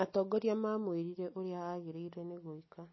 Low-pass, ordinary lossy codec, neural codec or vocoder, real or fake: 7.2 kHz; MP3, 24 kbps; none; real